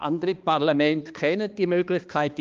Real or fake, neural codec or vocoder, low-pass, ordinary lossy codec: fake; codec, 16 kHz, 2 kbps, X-Codec, HuBERT features, trained on balanced general audio; 7.2 kHz; Opus, 32 kbps